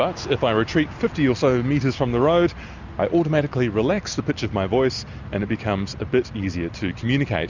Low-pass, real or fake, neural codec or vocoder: 7.2 kHz; real; none